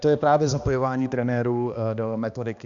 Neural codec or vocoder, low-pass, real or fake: codec, 16 kHz, 2 kbps, X-Codec, HuBERT features, trained on balanced general audio; 7.2 kHz; fake